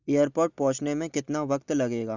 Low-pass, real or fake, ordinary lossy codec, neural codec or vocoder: 7.2 kHz; real; none; none